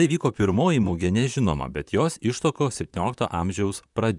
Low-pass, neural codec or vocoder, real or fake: 10.8 kHz; vocoder, 44.1 kHz, 128 mel bands, Pupu-Vocoder; fake